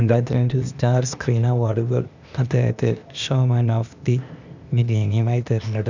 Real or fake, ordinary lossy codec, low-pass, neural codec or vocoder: fake; none; 7.2 kHz; codec, 16 kHz, 0.8 kbps, ZipCodec